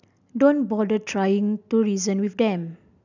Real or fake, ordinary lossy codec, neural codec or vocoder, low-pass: real; none; none; 7.2 kHz